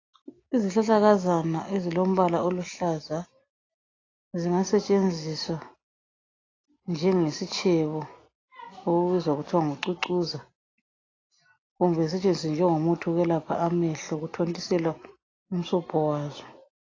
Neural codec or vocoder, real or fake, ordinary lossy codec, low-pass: none; real; AAC, 32 kbps; 7.2 kHz